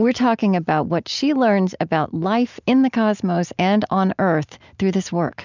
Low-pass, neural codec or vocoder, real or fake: 7.2 kHz; none; real